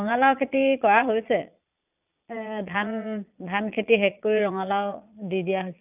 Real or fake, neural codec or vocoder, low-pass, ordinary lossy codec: fake; vocoder, 22.05 kHz, 80 mel bands, Vocos; 3.6 kHz; none